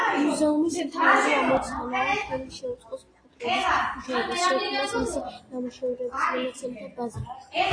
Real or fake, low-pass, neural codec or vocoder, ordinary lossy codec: real; 9.9 kHz; none; AAC, 32 kbps